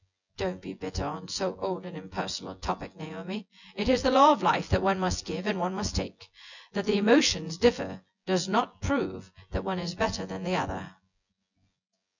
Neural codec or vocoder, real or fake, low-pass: vocoder, 24 kHz, 100 mel bands, Vocos; fake; 7.2 kHz